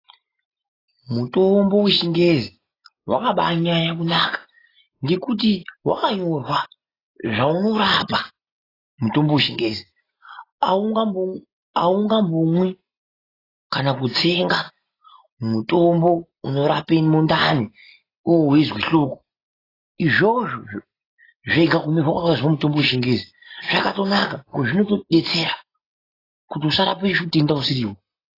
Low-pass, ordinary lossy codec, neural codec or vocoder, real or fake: 5.4 kHz; AAC, 24 kbps; none; real